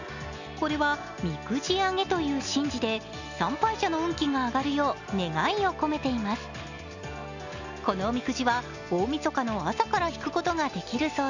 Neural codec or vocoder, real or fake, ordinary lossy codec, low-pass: none; real; none; 7.2 kHz